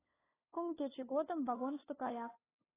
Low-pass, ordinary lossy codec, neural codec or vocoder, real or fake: 3.6 kHz; AAC, 16 kbps; codec, 16 kHz, 2 kbps, FunCodec, trained on LibriTTS, 25 frames a second; fake